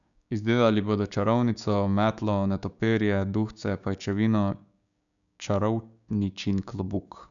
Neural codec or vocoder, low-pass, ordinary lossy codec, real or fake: codec, 16 kHz, 6 kbps, DAC; 7.2 kHz; none; fake